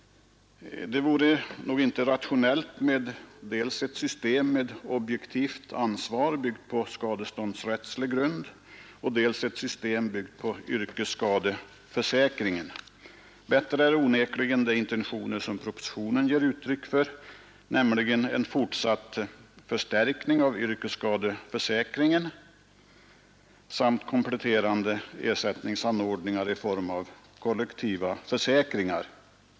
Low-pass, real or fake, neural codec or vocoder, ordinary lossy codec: none; real; none; none